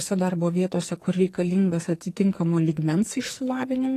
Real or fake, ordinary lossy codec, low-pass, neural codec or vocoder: fake; AAC, 48 kbps; 14.4 kHz; codec, 44.1 kHz, 2.6 kbps, SNAC